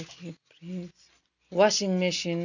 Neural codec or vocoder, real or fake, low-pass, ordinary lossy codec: none; real; 7.2 kHz; none